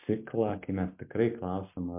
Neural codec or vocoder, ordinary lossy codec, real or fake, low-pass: none; MP3, 32 kbps; real; 3.6 kHz